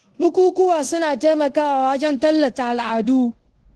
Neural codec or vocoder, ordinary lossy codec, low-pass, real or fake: codec, 24 kHz, 0.5 kbps, DualCodec; Opus, 16 kbps; 10.8 kHz; fake